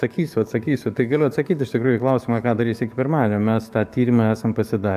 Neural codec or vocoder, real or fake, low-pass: codec, 44.1 kHz, 7.8 kbps, DAC; fake; 14.4 kHz